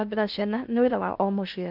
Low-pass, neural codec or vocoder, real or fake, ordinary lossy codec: 5.4 kHz; codec, 16 kHz in and 24 kHz out, 0.8 kbps, FocalCodec, streaming, 65536 codes; fake; none